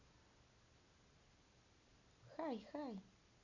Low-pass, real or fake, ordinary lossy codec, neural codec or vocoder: 7.2 kHz; real; none; none